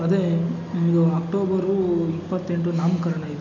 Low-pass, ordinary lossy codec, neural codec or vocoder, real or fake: 7.2 kHz; none; none; real